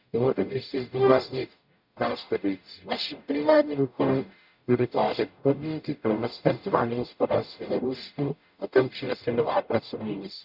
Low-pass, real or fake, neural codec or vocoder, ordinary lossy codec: 5.4 kHz; fake; codec, 44.1 kHz, 0.9 kbps, DAC; none